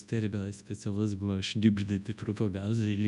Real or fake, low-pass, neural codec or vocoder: fake; 10.8 kHz; codec, 24 kHz, 0.9 kbps, WavTokenizer, large speech release